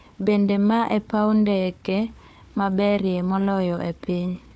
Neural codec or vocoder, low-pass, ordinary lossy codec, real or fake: codec, 16 kHz, 4 kbps, FunCodec, trained on Chinese and English, 50 frames a second; none; none; fake